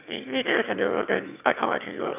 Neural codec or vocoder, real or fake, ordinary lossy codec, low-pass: autoencoder, 22.05 kHz, a latent of 192 numbers a frame, VITS, trained on one speaker; fake; none; 3.6 kHz